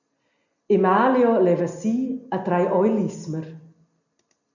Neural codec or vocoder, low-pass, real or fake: none; 7.2 kHz; real